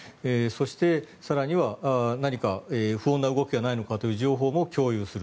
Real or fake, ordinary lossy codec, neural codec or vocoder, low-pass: real; none; none; none